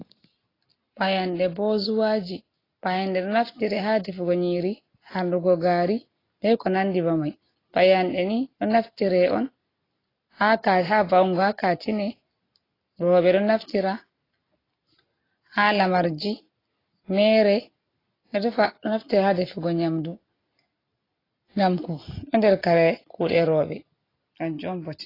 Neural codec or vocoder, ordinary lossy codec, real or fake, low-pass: none; AAC, 24 kbps; real; 5.4 kHz